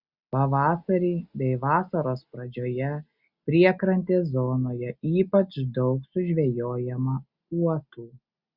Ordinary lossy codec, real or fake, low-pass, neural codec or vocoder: Opus, 64 kbps; real; 5.4 kHz; none